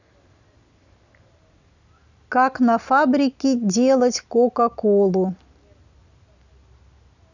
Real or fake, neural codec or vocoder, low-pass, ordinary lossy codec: real; none; 7.2 kHz; none